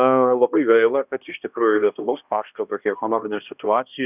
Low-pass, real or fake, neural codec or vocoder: 3.6 kHz; fake; codec, 16 kHz, 1 kbps, X-Codec, HuBERT features, trained on balanced general audio